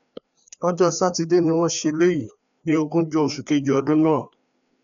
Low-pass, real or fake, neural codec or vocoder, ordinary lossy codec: 7.2 kHz; fake; codec, 16 kHz, 2 kbps, FreqCodec, larger model; none